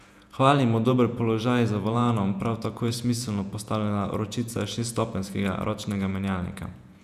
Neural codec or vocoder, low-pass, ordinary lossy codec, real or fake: vocoder, 48 kHz, 128 mel bands, Vocos; 14.4 kHz; none; fake